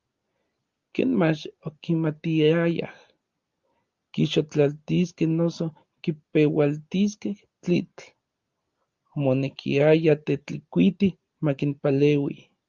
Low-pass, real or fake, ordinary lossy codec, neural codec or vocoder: 7.2 kHz; real; Opus, 32 kbps; none